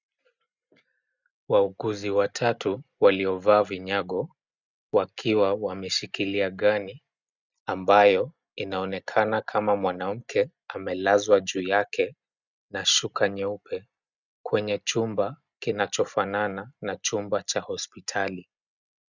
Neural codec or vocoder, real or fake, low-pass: none; real; 7.2 kHz